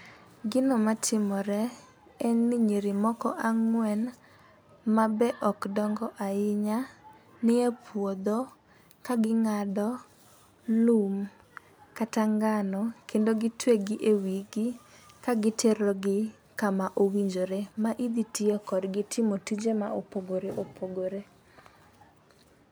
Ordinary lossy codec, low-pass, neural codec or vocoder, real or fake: none; none; none; real